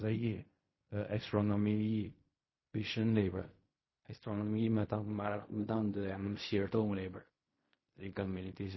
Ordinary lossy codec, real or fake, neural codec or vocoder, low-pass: MP3, 24 kbps; fake; codec, 16 kHz in and 24 kHz out, 0.4 kbps, LongCat-Audio-Codec, fine tuned four codebook decoder; 7.2 kHz